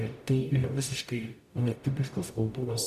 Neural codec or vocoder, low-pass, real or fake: codec, 44.1 kHz, 0.9 kbps, DAC; 14.4 kHz; fake